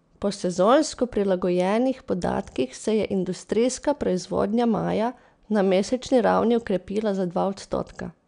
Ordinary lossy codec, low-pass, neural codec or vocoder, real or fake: none; 9.9 kHz; none; real